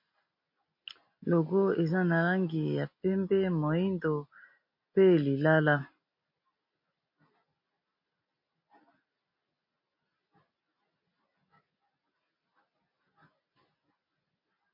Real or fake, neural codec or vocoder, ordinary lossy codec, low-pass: real; none; MP3, 32 kbps; 5.4 kHz